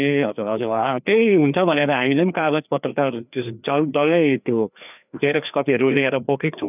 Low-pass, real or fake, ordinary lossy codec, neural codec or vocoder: 3.6 kHz; fake; none; codec, 16 kHz, 2 kbps, FreqCodec, larger model